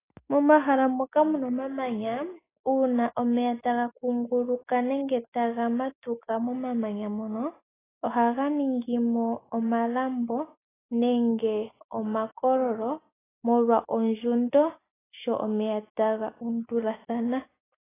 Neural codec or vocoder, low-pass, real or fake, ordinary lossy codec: none; 3.6 kHz; real; AAC, 16 kbps